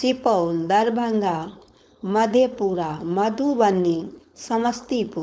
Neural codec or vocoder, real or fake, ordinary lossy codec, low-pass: codec, 16 kHz, 4.8 kbps, FACodec; fake; none; none